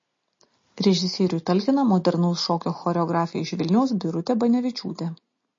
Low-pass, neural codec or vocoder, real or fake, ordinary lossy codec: 7.2 kHz; none; real; MP3, 32 kbps